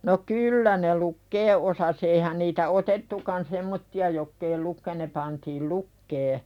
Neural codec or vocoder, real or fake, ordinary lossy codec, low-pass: none; real; none; 19.8 kHz